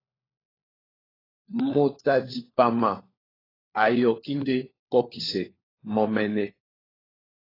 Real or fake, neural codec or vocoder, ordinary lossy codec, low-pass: fake; codec, 16 kHz, 4 kbps, FunCodec, trained on LibriTTS, 50 frames a second; AAC, 24 kbps; 5.4 kHz